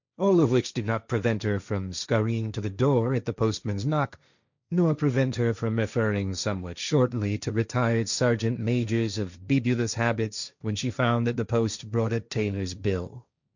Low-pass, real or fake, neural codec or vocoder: 7.2 kHz; fake; codec, 16 kHz, 1.1 kbps, Voila-Tokenizer